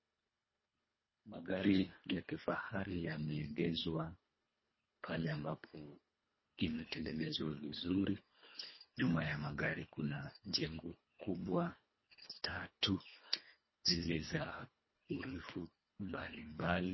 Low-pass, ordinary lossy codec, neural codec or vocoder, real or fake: 7.2 kHz; MP3, 24 kbps; codec, 24 kHz, 1.5 kbps, HILCodec; fake